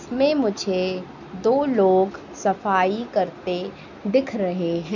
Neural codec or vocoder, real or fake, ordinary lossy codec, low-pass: none; real; none; 7.2 kHz